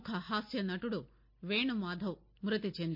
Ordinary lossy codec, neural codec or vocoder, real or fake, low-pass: MP3, 32 kbps; none; real; 5.4 kHz